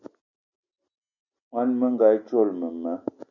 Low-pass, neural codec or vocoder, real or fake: 7.2 kHz; none; real